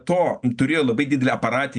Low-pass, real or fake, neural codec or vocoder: 9.9 kHz; real; none